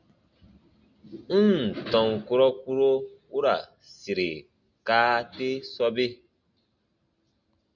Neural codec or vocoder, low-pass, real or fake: none; 7.2 kHz; real